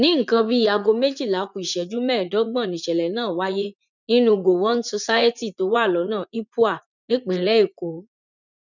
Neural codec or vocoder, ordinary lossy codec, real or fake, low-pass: vocoder, 44.1 kHz, 80 mel bands, Vocos; none; fake; 7.2 kHz